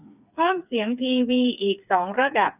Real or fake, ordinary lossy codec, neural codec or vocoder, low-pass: fake; none; codec, 16 kHz, 4 kbps, FreqCodec, smaller model; 3.6 kHz